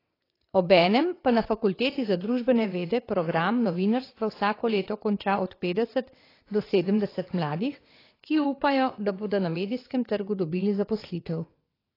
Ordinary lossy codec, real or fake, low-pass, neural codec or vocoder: AAC, 24 kbps; fake; 5.4 kHz; vocoder, 22.05 kHz, 80 mel bands, WaveNeXt